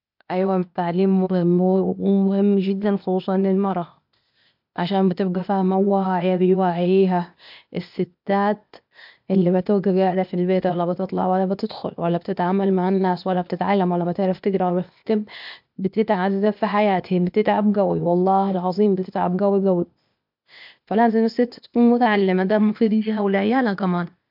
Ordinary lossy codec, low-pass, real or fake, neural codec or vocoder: MP3, 48 kbps; 5.4 kHz; fake; codec, 16 kHz, 0.8 kbps, ZipCodec